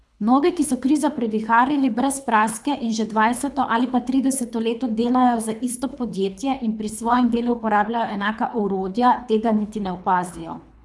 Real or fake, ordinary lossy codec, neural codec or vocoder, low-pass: fake; none; codec, 24 kHz, 3 kbps, HILCodec; none